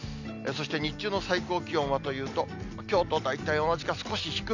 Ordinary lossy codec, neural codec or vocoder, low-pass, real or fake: none; none; 7.2 kHz; real